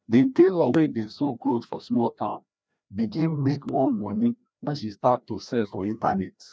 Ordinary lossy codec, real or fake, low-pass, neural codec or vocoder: none; fake; none; codec, 16 kHz, 1 kbps, FreqCodec, larger model